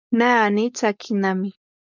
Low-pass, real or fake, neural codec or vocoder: 7.2 kHz; fake; codec, 16 kHz, 4.8 kbps, FACodec